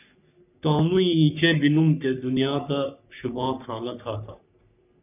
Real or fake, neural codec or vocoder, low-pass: fake; codec, 44.1 kHz, 3.4 kbps, Pupu-Codec; 3.6 kHz